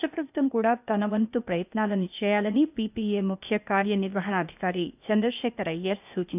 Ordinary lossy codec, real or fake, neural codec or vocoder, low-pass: none; fake; codec, 16 kHz, 0.8 kbps, ZipCodec; 3.6 kHz